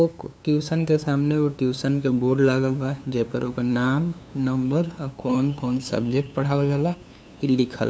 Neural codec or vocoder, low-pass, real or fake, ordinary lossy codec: codec, 16 kHz, 2 kbps, FunCodec, trained on LibriTTS, 25 frames a second; none; fake; none